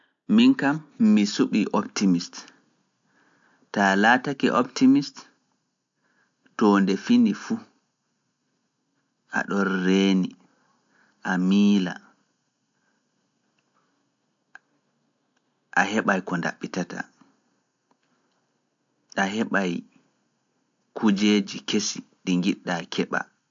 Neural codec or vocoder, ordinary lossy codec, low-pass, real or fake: none; AAC, 64 kbps; 7.2 kHz; real